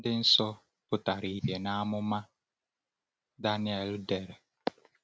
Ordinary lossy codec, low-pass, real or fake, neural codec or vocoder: none; none; real; none